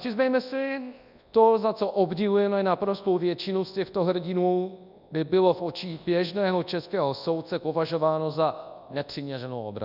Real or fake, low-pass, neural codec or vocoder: fake; 5.4 kHz; codec, 24 kHz, 0.9 kbps, WavTokenizer, large speech release